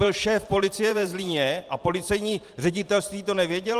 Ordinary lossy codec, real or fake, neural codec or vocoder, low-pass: Opus, 32 kbps; fake; vocoder, 44.1 kHz, 128 mel bands every 512 samples, BigVGAN v2; 14.4 kHz